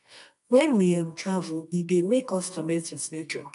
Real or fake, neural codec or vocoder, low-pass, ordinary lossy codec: fake; codec, 24 kHz, 0.9 kbps, WavTokenizer, medium music audio release; 10.8 kHz; none